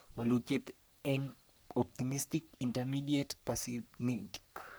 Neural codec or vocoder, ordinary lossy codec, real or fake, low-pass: codec, 44.1 kHz, 3.4 kbps, Pupu-Codec; none; fake; none